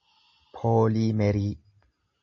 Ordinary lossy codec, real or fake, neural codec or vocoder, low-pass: AAC, 48 kbps; real; none; 7.2 kHz